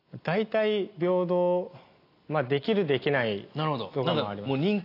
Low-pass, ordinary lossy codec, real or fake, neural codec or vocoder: 5.4 kHz; AAC, 32 kbps; real; none